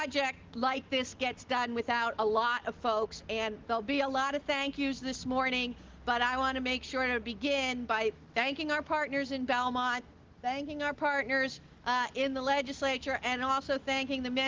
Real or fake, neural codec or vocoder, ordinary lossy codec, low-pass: real; none; Opus, 16 kbps; 7.2 kHz